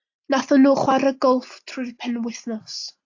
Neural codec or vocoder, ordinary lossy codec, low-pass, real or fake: none; AAC, 48 kbps; 7.2 kHz; real